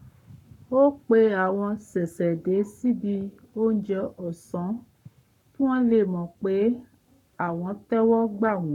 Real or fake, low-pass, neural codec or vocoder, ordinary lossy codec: fake; 19.8 kHz; codec, 44.1 kHz, 7.8 kbps, Pupu-Codec; none